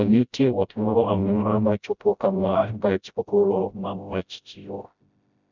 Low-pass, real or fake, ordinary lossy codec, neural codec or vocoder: 7.2 kHz; fake; none; codec, 16 kHz, 0.5 kbps, FreqCodec, smaller model